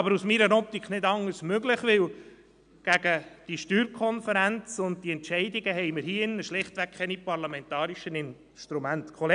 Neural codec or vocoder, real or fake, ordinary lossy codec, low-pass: none; real; none; 9.9 kHz